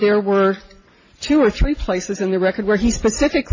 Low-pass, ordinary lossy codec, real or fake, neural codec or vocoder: 7.2 kHz; MP3, 32 kbps; real; none